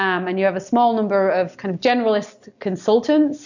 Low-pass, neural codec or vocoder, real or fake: 7.2 kHz; none; real